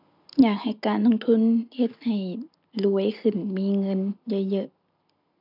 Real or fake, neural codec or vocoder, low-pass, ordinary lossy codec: real; none; 5.4 kHz; none